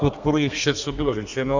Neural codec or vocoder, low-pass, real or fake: codec, 32 kHz, 1.9 kbps, SNAC; 7.2 kHz; fake